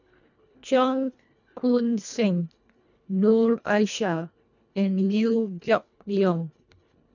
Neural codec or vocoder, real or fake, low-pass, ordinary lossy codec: codec, 24 kHz, 1.5 kbps, HILCodec; fake; 7.2 kHz; none